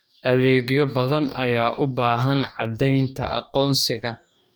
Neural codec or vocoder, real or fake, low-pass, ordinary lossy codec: codec, 44.1 kHz, 2.6 kbps, DAC; fake; none; none